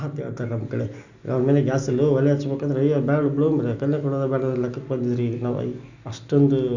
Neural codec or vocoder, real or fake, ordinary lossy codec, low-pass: none; real; none; 7.2 kHz